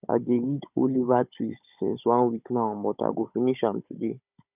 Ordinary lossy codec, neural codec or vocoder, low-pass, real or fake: none; codec, 16 kHz, 16 kbps, FunCodec, trained on Chinese and English, 50 frames a second; 3.6 kHz; fake